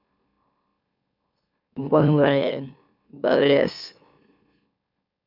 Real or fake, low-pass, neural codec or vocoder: fake; 5.4 kHz; autoencoder, 44.1 kHz, a latent of 192 numbers a frame, MeloTTS